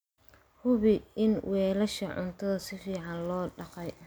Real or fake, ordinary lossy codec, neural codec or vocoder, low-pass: real; none; none; none